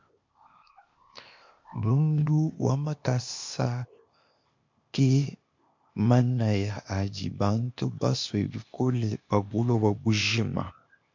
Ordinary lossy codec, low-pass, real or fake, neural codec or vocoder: MP3, 48 kbps; 7.2 kHz; fake; codec, 16 kHz, 0.8 kbps, ZipCodec